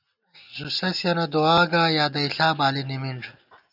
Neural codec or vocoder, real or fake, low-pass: none; real; 5.4 kHz